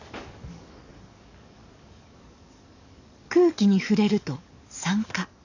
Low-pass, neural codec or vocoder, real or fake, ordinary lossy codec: 7.2 kHz; codec, 44.1 kHz, 7.8 kbps, DAC; fake; none